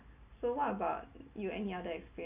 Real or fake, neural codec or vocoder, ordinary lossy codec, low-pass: real; none; none; 3.6 kHz